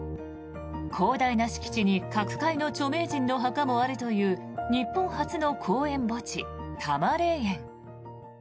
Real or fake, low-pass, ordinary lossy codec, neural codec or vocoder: real; none; none; none